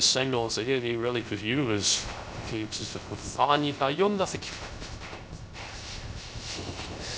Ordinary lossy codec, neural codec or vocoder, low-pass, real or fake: none; codec, 16 kHz, 0.3 kbps, FocalCodec; none; fake